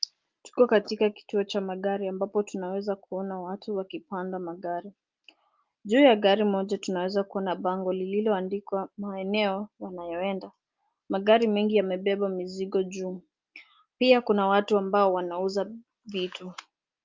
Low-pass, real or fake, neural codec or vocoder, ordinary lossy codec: 7.2 kHz; real; none; Opus, 24 kbps